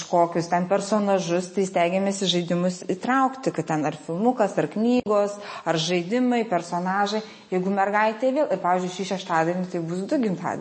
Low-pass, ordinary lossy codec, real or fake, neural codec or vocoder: 9.9 kHz; MP3, 32 kbps; real; none